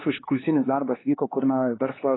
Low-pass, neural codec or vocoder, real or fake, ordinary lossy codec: 7.2 kHz; codec, 16 kHz, 2 kbps, X-Codec, HuBERT features, trained on LibriSpeech; fake; AAC, 16 kbps